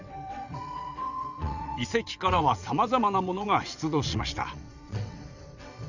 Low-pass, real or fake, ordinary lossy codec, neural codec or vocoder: 7.2 kHz; fake; none; vocoder, 22.05 kHz, 80 mel bands, WaveNeXt